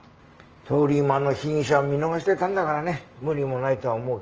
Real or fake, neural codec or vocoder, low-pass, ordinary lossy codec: real; none; 7.2 kHz; Opus, 24 kbps